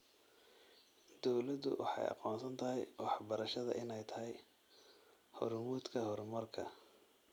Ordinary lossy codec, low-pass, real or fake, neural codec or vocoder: none; none; real; none